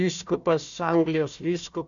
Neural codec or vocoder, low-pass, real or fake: codec, 16 kHz, 1 kbps, FunCodec, trained on Chinese and English, 50 frames a second; 7.2 kHz; fake